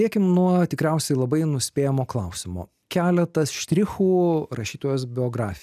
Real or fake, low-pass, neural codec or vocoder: real; 14.4 kHz; none